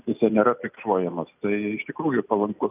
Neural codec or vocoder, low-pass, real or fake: none; 3.6 kHz; real